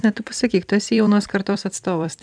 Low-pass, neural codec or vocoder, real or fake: 9.9 kHz; vocoder, 44.1 kHz, 128 mel bands every 256 samples, BigVGAN v2; fake